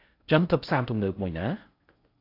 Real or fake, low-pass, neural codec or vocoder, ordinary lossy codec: fake; 5.4 kHz; codec, 16 kHz in and 24 kHz out, 0.6 kbps, FocalCodec, streaming, 4096 codes; AAC, 32 kbps